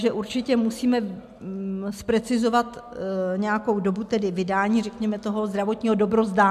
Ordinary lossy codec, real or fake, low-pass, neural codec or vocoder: MP3, 96 kbps; real; 14.4 kHz; none